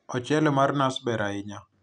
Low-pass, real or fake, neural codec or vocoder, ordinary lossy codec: 9.9 kHz; real; none; none